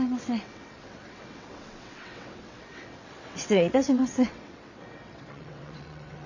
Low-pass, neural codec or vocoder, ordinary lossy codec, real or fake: 7.2 kHz; vocoder, 22.05 kHz, 80 mel bands, WaveNeXt; none; fake